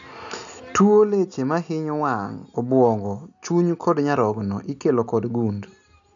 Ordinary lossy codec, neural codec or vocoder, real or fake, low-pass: none; none; real; 7.2 kHz